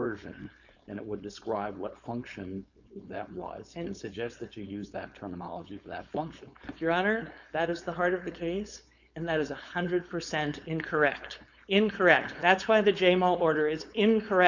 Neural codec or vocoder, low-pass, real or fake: codec, 16 kHz, 4.8 kbps, FACodec; 7.2 kHz; fake